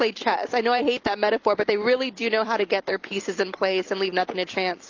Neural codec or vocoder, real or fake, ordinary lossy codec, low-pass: none; real; Opus, 24 kbps; 7.2 kHz